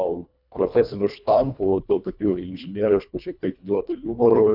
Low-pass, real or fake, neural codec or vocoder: 5.4 kHz; fake; codec, 24 kHz, 1.5 kbps, HILCodec